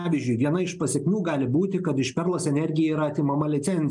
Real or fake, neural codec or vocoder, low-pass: real; none; 10.8 kHz